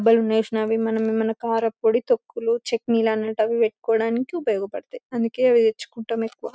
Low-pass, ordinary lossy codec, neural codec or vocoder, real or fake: none; none; none; real